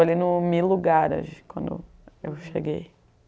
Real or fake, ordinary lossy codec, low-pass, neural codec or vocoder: real; none; none; none